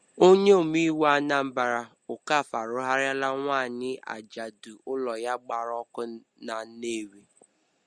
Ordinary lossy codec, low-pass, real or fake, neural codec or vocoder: Opus, 64 kbps; 9.9 kHz; real; none